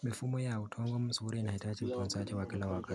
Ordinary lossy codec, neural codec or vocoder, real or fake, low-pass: none; none; real; none